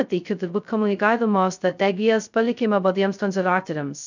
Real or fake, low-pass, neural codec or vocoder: fake; 7.2 kHz; codec, 16 kHz, 0.2 kbps, FocalCodec